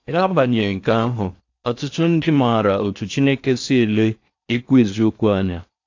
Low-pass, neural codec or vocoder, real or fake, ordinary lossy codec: 7.2 kHz; codec, 16 kHz in and 24 kHz out, 0.6 kbps, FocalCodec, streaming, 4096 codes; fake; AAC, 48 kbps